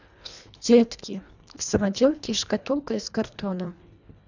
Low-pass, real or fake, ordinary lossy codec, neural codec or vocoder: 7.2 kHz; fake; none; codec, 24 kHz, 1.5 kbps, HILCodec